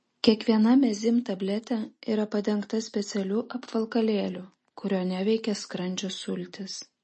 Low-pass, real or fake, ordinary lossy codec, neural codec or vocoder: 10.8 kHz; real; MP3, 32 kbps; none